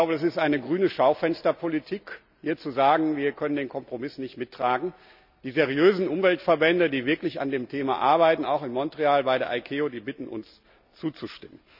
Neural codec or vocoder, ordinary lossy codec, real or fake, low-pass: none; none; real; 5.4 kHz